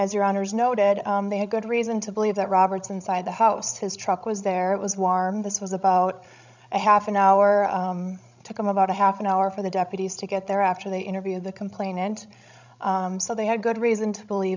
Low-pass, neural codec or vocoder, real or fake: 7.2 kHz; codec, 16 kHz, 16 kbps, FreqCodec, larger model; fake